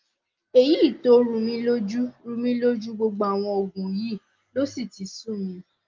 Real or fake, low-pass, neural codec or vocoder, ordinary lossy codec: real; 7.2 kHz; none; Opus, 24 kbps